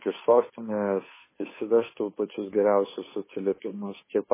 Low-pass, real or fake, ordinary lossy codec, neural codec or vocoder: 3.6 kHz; fake; MP3, 16 kbps; codec, 16 kHz, 2 kbps, FunCodec, trained on Chinese and English, 25 frames a second